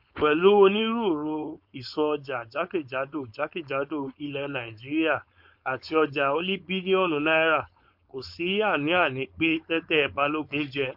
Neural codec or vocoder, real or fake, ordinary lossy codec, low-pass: codec, 16 kHz, 4.8 kbps, FACodec; fake; MP3, 48 kbps; 5.4 kHz